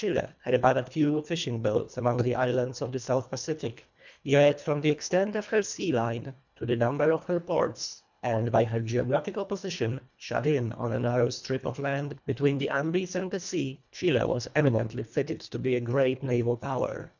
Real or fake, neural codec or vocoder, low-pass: fake; codec, 24 kHz, 1.5 kbps, HILCodec; 7.2 kHz